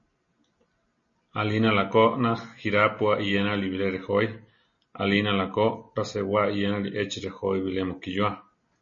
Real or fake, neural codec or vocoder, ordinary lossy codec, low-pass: real; none; MP3, 32 kbps; 7.2 kHz